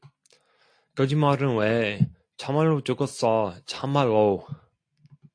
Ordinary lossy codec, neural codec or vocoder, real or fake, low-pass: AAC, 48 kbps; none; real; 9.9 kHz